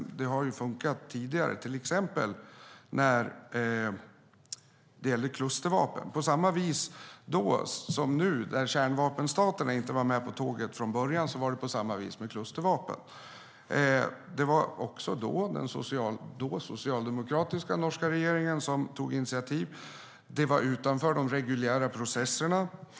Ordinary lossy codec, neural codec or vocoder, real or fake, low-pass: none; none; real; none